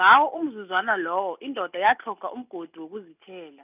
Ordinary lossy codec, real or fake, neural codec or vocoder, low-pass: none; real; none; 3.6 kHz